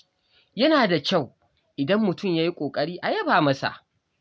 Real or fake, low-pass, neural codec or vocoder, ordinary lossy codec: real; none; none; none